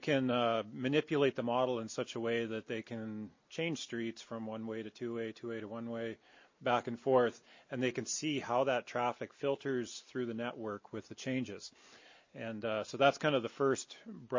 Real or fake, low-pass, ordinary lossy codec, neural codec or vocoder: real; 7.2 kHz; MP3, 32 kbps; none